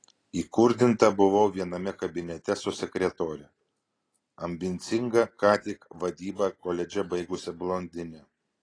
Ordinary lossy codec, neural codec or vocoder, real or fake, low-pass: AAC, 32 kbps; none; real; 9.9 kHz